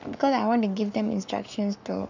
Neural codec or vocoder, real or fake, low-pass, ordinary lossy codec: codec, 44.1 kHz, 7.8 kbps, Pupu-Codec; fake; 7.2 kHz; none